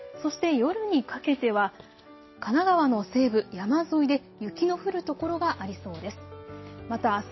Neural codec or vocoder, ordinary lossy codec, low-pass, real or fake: none; MP3, 24 kbps; 7.2 kHz; real